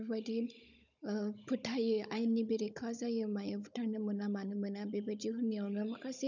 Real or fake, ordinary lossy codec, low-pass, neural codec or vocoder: fake; none; 7.2 kHz; codec, 16 kHz, 16 kbps, FunCodec, trained on Chinese and English, 50 frames a second